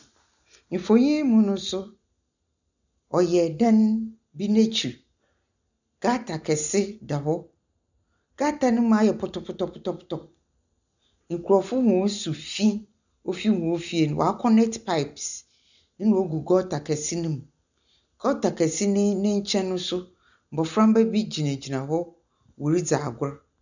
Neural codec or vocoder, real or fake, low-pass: none; real; 7.2 kHz